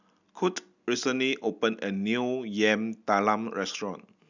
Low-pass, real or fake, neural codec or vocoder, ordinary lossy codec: 7.2 kHz; real; none; none